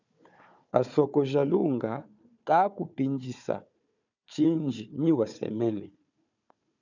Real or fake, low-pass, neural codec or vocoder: fake; 7.2 kHz; codec, 16 kHz, 4 kbps, FunCodec, trained on Chinese and English, 50 frames a second